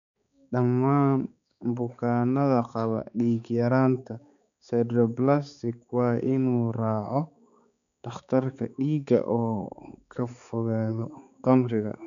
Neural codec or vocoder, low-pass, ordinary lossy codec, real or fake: codec, 16 kHz, 4 kbps, X-Codec, HuBERT features, trained on balanced general audio; 7.2 kHz; none; fake